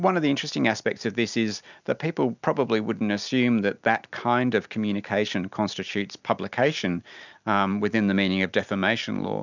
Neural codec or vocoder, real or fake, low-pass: none; real; 7.2 kHz